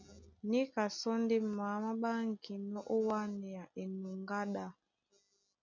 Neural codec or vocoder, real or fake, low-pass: none; real; 7.2 kHz